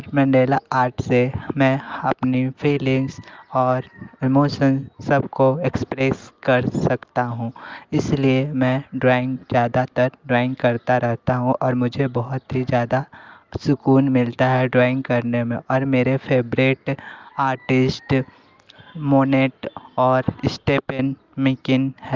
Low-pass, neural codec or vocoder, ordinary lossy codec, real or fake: 7.2 kHz; none; Opus, 24 kbps; real